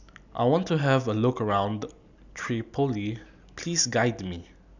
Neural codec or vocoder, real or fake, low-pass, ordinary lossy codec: none; real; 7.2 kHz; none